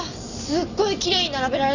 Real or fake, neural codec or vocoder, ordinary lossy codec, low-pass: real; none; none; 7.2 kHz